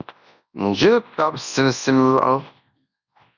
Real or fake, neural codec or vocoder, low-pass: fake; codec, 24 kHz, 0.9 kbps, WavTokenizer, large speech release; 7.2 kHz